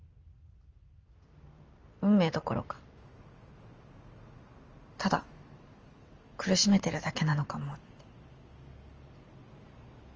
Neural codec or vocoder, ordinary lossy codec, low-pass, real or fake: none; Opus, 24 kbps; 7.2 kHz; real